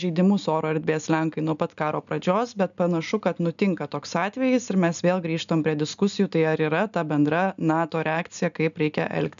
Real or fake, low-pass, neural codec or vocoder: real; 7.2 kHz; none